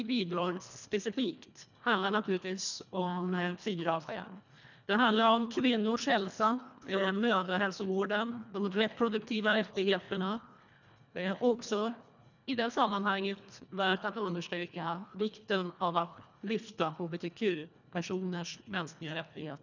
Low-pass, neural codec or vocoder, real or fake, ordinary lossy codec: 7.2 kHz; codec, 24 kHz, 1.5 kbps, HILCodec; fake; none